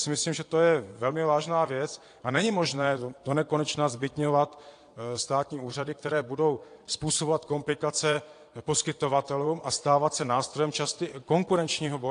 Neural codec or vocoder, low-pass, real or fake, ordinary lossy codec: vocoder, 22.05 kHz, 80 mel bands, Vocos; 9.9 kHz; fake; AAC, 48 kbps